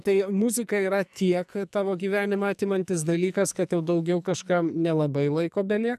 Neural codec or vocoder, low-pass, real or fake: codec, 44.1 kHz, 2.6 kbps, SNAC; 14.4 kHz; fake